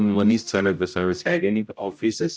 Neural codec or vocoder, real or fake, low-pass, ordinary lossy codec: codec, 16 kHz, 0.5 kbps, X-Codec, HuBERT features, trained on general audio; fake; none; none